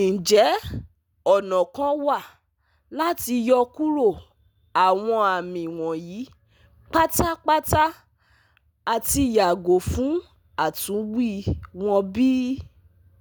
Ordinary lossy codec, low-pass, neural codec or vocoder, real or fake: none; none; none; real